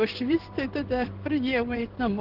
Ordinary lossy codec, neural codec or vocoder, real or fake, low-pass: Opus, 16 kbps; codec, 16 kHz in and 24 kHz out, 1 kbps, XY-Tokenizer; fake; 5.4 kHz